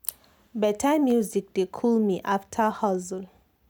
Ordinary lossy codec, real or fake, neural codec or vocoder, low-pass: none; real; none; none